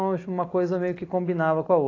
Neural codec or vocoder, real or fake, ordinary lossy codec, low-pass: none; real; AAC, 32 kbps; 7.2 kHz